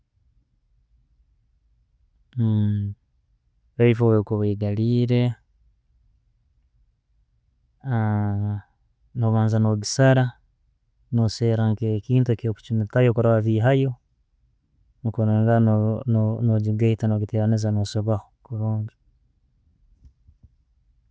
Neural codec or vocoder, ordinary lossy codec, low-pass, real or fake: none; none; none; real